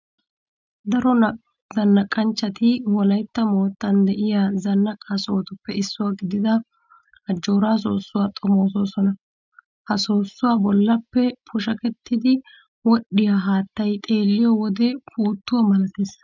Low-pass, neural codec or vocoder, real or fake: 7.2 kHz; none; real